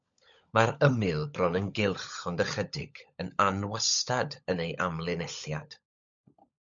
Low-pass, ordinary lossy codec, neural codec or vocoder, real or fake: 7.2 kHz; MP3, 64 kbps; codec, 16 kHz, 16 kbps, FunCodec, trained on LibriTTS, 50 frames a second; fake